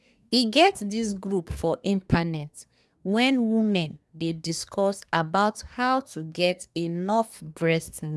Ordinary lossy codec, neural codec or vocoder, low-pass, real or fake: none; codec, 24 kHz, 1 kbps, SNAC; none; fake